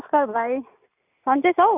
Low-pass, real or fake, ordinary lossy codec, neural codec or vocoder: 3.6 kHz; real; none; none